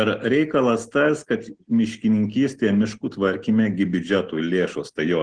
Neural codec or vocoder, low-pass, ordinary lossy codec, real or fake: none; 9.9 kHz; Opus, 16 kbps; real